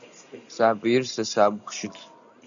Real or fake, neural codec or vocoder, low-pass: real; none; 7.2 kHz